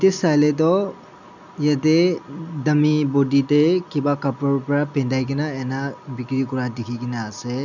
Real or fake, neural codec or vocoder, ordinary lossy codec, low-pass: real; none; none; 7.2 kHz